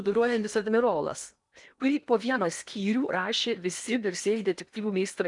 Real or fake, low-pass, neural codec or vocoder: fake; 10.8 kHz; codec, 16 kHz in and 24 kHz out, 0.8 kbps, FocalCodec, streaming, 65536 codes